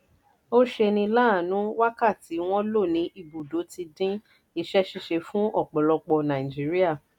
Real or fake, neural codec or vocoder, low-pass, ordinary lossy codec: real; none; 19.8 kHz; none